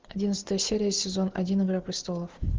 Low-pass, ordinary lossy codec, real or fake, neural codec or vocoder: 7.2 kHz; Opus, 16 kbps; real; none